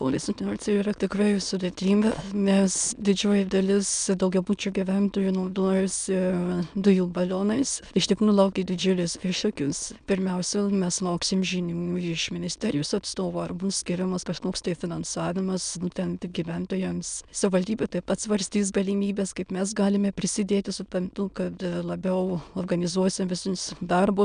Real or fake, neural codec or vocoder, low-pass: fake; autoencoder, 22.05 kHz, a latent of 192 numbers a frame, VITS, trained on many speakers; 9.9 kHz